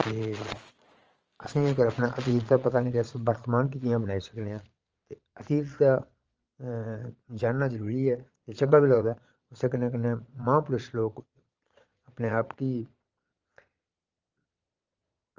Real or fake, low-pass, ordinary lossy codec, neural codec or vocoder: fake; 7.2 kHz; Opus, 24 kbps; vocoder, 22.05 kHz, 80 mel bands, Vocos